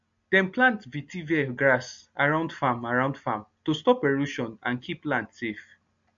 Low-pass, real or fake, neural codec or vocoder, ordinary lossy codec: 7.2 kHz; real; none; MP3, 48 kbps